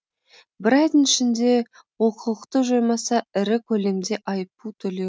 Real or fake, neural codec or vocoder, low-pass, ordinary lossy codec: real; none; none; none